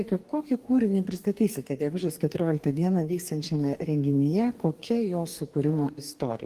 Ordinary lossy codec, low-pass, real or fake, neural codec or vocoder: Opus, 24 kbps; 14.4 kHz; fake; codec, 44.1 kHz, 2.6 kbps, DAC